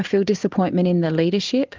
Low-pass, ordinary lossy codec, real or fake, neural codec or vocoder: 7.2 kHz; Opus, 24 kbps; real; none